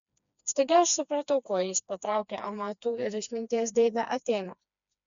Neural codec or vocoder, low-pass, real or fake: codec, 16 kHz, 2 kbps, FreqCodec, smaller model; 7.2 kHz; fake